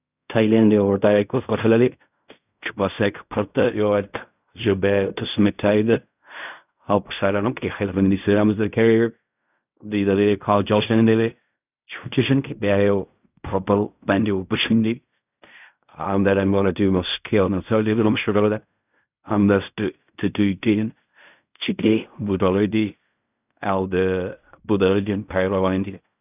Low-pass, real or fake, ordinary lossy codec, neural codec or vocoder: 3.6 kHz; fake; none; codec, 16 kHz in and 24 kHz out, 0.4 kbps, LongCat-Audio-Codec, fine tuned four codebook decoder